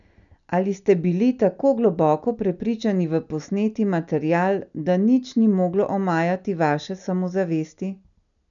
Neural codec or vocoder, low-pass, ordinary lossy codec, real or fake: none; 7.2 kHz; none; real